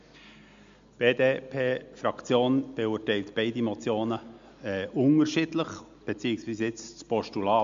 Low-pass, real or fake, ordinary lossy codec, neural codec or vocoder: 7.2 kHz; real; MP3, 64 kbps; none